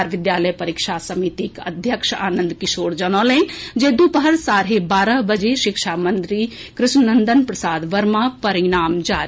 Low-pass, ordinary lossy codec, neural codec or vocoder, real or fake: none; none; none; real